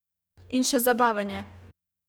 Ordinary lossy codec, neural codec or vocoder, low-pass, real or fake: none; codec, 44.1 kHz, 2.6 kbps, DAC; none; fake